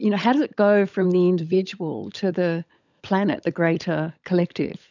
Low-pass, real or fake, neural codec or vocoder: 7.2 kHz; fake; codec, 16 kHz, 16 kbps, FunCodec, trained on LibriTTS, 50 frames a second